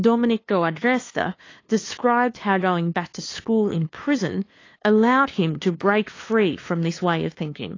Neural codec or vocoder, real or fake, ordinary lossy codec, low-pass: codec, 16 kHz, 2 kbps, FunCodec, trained on LibriTTS, 25 frames a second; fake; AAC, 32 kbps; 7.2 kHz